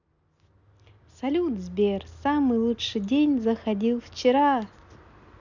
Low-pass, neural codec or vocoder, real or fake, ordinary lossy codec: 7.2 kHz; none; real; none